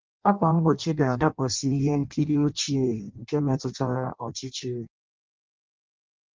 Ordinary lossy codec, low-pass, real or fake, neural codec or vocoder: Opus, 24 kbps; 7.2 kHz; fake; codec, 16 kHz in and 24 kHz out, 0.6 kbps, FireRedTTS-2 codec